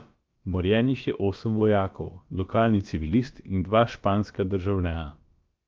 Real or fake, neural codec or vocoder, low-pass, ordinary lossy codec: fake; codec, 16 kHz, about 1 kbps, DyCAST, with the encoder's durations; 7.2 kHz; Opus, 24 kbps